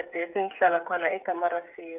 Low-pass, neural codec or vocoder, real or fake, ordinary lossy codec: 3.6 kHz; codec, 16 kHz, 8 kbps, FreqCodec, smaller model; fake; none